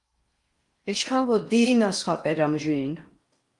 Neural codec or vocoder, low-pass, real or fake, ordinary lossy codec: codec, 16 kHz in and 24 kHz out, 0.6 kbps, FocalCodec, streaming, 4096 codes; 10.8 kHz; fake; Opus, 32 kbps